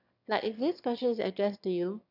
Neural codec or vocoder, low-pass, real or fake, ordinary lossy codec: autoencoder, 22.05 kHz, a latent of 192 numbers a frame, VITS, trained on one speaker; 5.4 kHz; fake; none